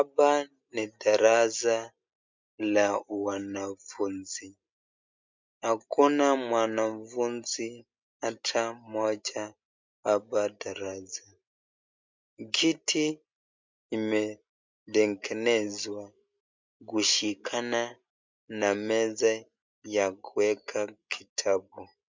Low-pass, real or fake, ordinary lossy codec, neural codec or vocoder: 7.2 kHz; real; MP3, 64 kbps; none